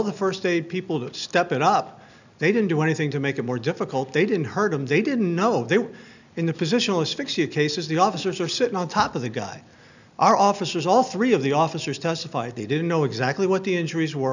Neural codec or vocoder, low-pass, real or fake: none; 7.2 kHz; real